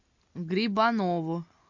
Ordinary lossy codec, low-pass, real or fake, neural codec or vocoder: MP3, 64 kbps; 7.2 kHz; real; none